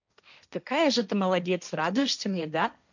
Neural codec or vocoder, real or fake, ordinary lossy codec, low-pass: codec, 16 kHz, 1.1 kbps, Voila-Tokenizer; fake; none; 7.2 kHz